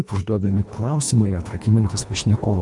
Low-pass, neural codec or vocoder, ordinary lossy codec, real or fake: 10.8 kHz; codec, 24 kHz, 1.5 kbps, HILCodec; MP3, 64 kbps; fake